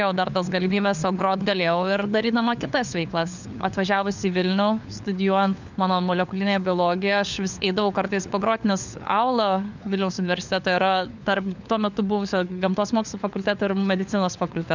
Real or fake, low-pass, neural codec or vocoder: fake; 7.2 kHz; codec, 16 kHz, 4 kbps, FunCodec, trained on LibriTTS, 50 frames a second